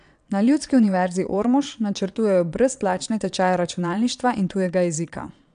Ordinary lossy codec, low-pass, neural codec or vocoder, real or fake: none; 9.9 kHz; vocoder, 22.05 kHz, 80 mel bands, Vocos; fake